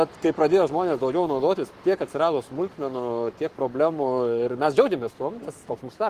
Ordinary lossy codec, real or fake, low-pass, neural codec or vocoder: Opus, 24 kbps; fake; 14.4 kHz; codec, 44.1 kHz, 7.8 kbps, Pupu-Codec